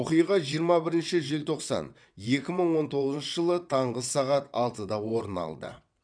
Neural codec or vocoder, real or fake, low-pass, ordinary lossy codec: vocoder, 22.05 kHz, 80 mel bands, WaveNeXt; fake; 9.9 kHz; none